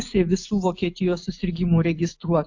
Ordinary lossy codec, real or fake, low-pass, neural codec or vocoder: AAC, 48 kbps; real; 7.2 kHz; none